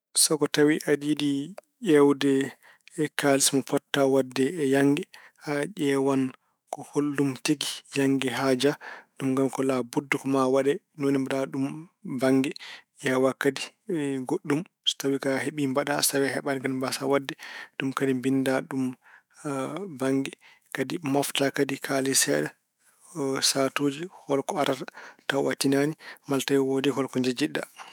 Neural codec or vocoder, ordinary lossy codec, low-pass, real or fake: autoencoder, 48 kHz, 128 numbers a frame, DAC-VAE, trained on Japanese speech; none; none; fake